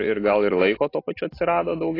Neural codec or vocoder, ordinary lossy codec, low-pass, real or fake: none; AAC, 24 kbps; 5.4 kHz; real